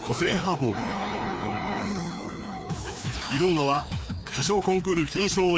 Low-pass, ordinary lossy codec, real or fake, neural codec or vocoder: none; none; fake; codec, 16 kHz, 2 kbps, FreqCodec, larger model